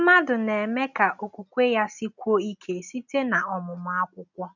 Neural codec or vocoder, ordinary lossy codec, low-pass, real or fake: none; none; 7.2 kHz; real